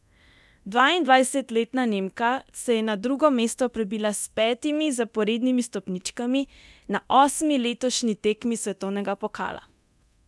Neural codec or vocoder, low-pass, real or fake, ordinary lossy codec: codec, 24 kHz, 0.9 kbps, DualCodec; none; fake; none